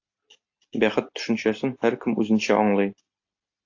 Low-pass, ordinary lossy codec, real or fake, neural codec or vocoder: 7.2 kHz; AAC, 48 kbps; real; none